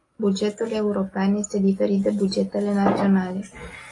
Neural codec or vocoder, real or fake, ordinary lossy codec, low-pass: none; real; AAC, 32 kbps; 10.8 kHz